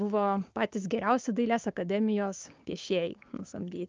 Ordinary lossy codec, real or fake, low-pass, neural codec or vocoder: Opus, 24 kbps; fake; 7.2 kHz; codec, 16 kHz, 6 kbps, DAC